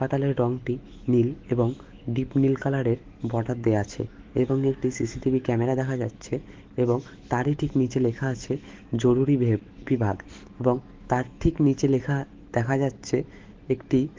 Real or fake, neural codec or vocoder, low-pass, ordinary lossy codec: fake; autoencoder, 48 kHz, 128 numbers a frame, DAC-VAE, trained on Japanese speech; 7.2 kHz; Opus, 16 kbps